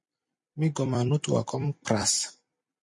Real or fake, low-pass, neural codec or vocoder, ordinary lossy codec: fake; 10.8 kHz; vocoder, 44.1 kHz, 128 mel bands every 256 samples, BigVGAN v2; MP3, 48 kbps